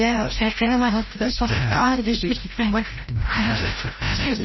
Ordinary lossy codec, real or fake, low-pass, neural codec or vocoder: MP3, 24 kbps; fake; 7.2 kHz; codec, 16 kHz, 0.5 kbps, FreqCodec, larger model